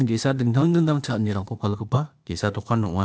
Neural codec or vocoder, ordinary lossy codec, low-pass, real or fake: codec, 16 kHz, 0.8 kbps, ZipCodec; none; none; fake